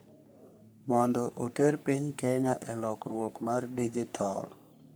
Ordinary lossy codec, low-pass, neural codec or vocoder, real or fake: none; none; codec, 44.1 kHz, 3.4 kbps, Pupu-Codec; fake